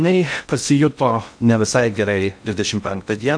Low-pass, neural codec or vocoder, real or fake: 9.9 kHz; codec, 16 kHz in and 24 kHz out, 0.6 kbps, FocalCodec, streaming, 2048 codes; fake